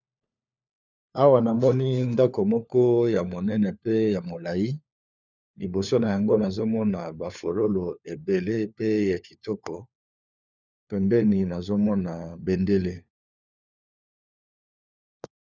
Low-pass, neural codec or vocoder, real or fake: 7.2 kHz; codec, 16 kHz, 4 kbps, FunCodec, trained on LibriTTS, 50 frames a second; fake